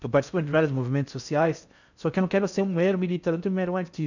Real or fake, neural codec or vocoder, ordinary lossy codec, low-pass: fake; codec, 16 kHz in and 24 kHz out, 0.6 kbps, FocalCodec, streaming, 4096 codes; none; 7.2 kHz